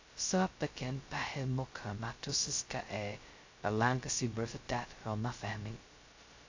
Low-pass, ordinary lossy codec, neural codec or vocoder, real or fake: 7.2 kHz; AAC, 48 kbps; codec, 16 kHz, 0.2 kbps, FocalCodec; fake